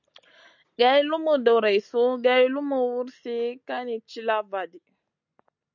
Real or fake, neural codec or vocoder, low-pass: real; none; 7.2 kHz